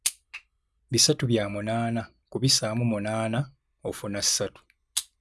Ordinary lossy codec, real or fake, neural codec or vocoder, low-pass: none; real; none; none